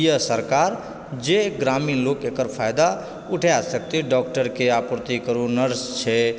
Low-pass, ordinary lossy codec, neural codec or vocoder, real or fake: none; none; none; real